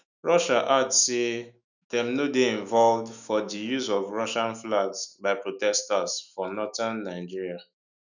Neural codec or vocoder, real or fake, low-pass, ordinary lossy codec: autoencoder, 48 kHz, 128 numbers a frame, DAC-VAE, trained on Japanese speech; fake; 7.2 kHz; none